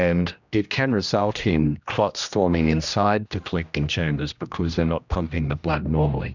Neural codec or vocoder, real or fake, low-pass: codec, 16 kHz, 1 kbps, X-Codec, HuBERT features, trained on general audio; fake; 7.2 kHz